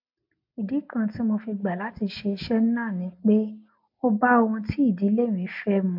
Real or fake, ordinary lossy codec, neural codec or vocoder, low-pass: real; MP3, 48 kbps; none; 5.4 kHz